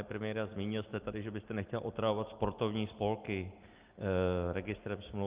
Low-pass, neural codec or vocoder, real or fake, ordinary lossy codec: 3.6 kHz; none; real; Opus, 32 kbps